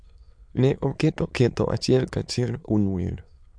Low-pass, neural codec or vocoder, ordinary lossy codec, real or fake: 9.9 kHz; autoencoder, 22.05 kHz, a latent of 192 numbers a frame, VITS, trained on many speakers; MP3, 64 kbps; fake